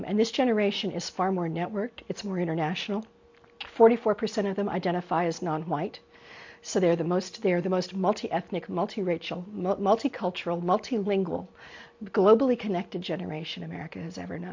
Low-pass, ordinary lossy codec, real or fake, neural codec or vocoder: 7.2 kHz; MP3, 48 kbps; real; none